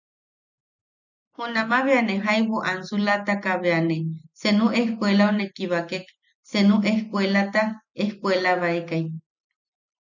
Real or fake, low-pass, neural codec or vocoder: real; 7.2 kHz; none